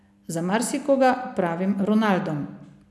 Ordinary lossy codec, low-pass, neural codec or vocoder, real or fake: none; none; none; real